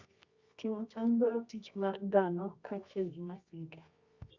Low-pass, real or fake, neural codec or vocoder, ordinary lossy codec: 7.2 kHz; fake; codec, 24 kHz, 0.9 kbps, WavTokenizer, medium music audio release; Opus, 64 kbps